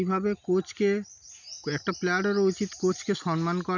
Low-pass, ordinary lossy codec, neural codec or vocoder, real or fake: 7.2 kHz; none; none; real